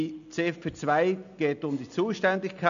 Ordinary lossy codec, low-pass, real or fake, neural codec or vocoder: none; 7.2 kHz; real; none